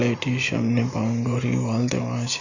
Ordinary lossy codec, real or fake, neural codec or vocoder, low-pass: none; real; none; 7.2 kHz